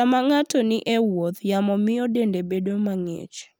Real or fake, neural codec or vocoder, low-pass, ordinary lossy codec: real; none; none; none